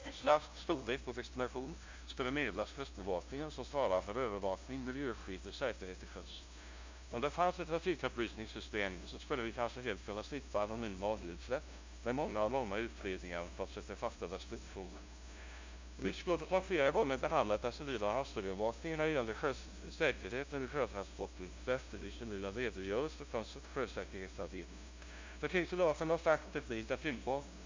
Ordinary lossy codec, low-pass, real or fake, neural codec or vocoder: MP3, 64 kbps; 7.2 kHz; fake; codec, 16 kHz, 0.5 kbps, FunCodec, trained on LibriTTS, 25 frames a second